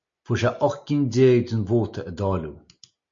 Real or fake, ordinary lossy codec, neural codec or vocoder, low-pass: real; MP3, 48 kbps; none; 7.2 kHz